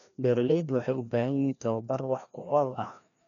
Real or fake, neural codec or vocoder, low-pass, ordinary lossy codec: fake; codec, 16 kHz, 1 kbps, FreqCodec, larger model; 7.2 kHz; none